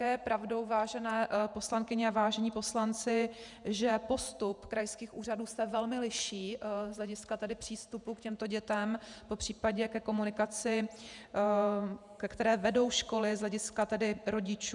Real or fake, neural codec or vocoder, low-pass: fake; vocoder, 48 kHz, 128 mel bands, Vocos; 10.8 kHz